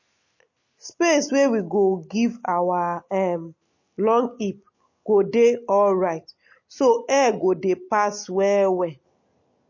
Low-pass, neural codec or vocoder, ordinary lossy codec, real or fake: 7.2 kHz; none; MP3, 32 kbps; real